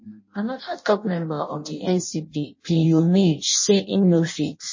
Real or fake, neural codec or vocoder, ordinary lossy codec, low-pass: fake; codec, 16 kHz in and 24 kHz out, 0.6 kbps, FireRedTTS-2 codec; MP3, 32 kbps; 7.2 kHz